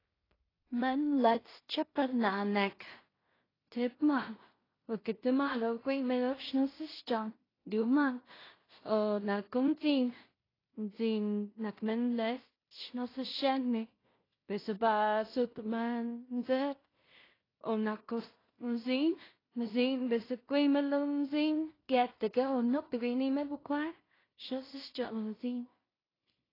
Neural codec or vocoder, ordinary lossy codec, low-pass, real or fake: codec, 16 kHz in and 24 kHz out, 0.4 kbps, LongCat-Audio-Codec, two codebook decoder; AAC, 24 kbps; 5.4 kHz; fake